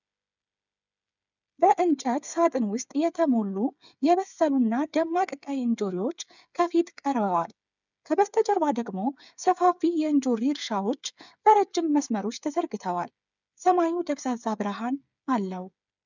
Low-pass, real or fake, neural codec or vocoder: 7.2 kHz; fake; codec, 16 kHz, 8 kbps, FreqCodec, smaller model